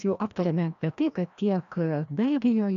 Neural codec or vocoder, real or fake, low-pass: codec, 16 kHz, 1 kbps, FreqCodec, larger model; fake; 7.2 kHz